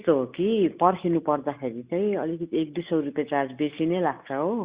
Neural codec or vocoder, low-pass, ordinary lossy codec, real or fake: none; 3.6 kHz; Opus, 64 kbps; real